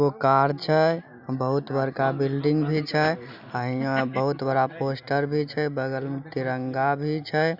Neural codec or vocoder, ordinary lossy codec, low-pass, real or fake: none; none; 5.4 kHz; real